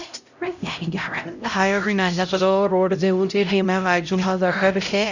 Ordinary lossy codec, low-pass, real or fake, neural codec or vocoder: none; 7.2 kHz; fake; codec, 16 kHz, 0.5 kbps, X-Codec, HuBERT features, trained on LibriSpeech